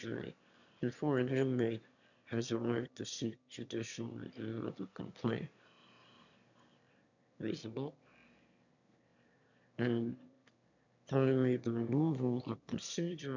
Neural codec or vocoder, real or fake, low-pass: autoencoder, 22.05 kHz, a latent of 192 numbers a frame, VITS, trained on one speaker; fake; 7.2 kHz